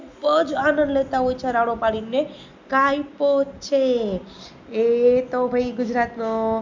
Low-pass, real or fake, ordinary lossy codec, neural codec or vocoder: 7.2 kHz; real; MP3, 64 kbps; none